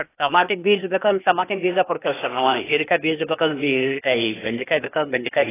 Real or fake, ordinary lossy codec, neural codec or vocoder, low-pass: fake; AAC, 16 kbps; codec, 16 kHz, 0.8 kbps, ZipCodec; 3.6 kHz